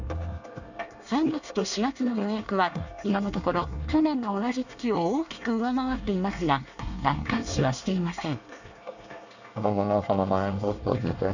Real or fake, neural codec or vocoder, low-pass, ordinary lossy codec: fake; codec, 24 kHz, 1 kbps, SNAC; 7.2 kHz; none